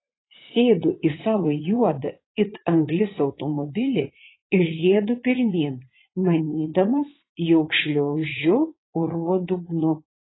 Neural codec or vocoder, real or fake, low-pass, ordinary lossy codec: vocoder, 44.1 kHz, 80 mel bands, Vocos; fake; 7.2 kHz; AAC, 16 kbps